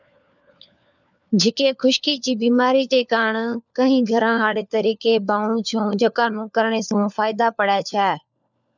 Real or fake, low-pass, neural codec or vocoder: fake; 7.2 kHz; codec, 16 kHz, 4 kbps, FunCodec, trained on LibriTTS, 50 frames a second